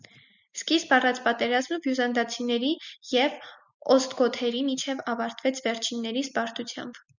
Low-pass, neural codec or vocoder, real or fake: 7.2 kHz; none; real